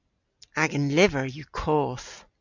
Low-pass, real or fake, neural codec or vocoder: 7.2 kHz; real; none